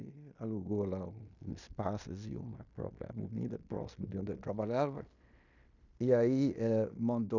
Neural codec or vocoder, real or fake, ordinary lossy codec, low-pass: codec, 16 kHz in and 24 kHz out, 0.9 kbps, LongCat-Audio-Codec, fine tuned four codebook decoder; fake; none; 7.2 kHz